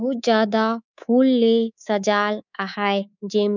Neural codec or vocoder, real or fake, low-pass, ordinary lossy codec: codec, 24 kHz, 3.1 kbps, DualCodec; fake; 7.2 kHz; none